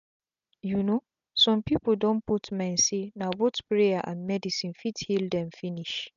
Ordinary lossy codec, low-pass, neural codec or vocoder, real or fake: none; 7.2 kHz; none; real